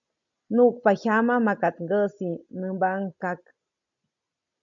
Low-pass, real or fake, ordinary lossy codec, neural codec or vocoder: 7.2 kHz; real; MP3, 64 kbps; none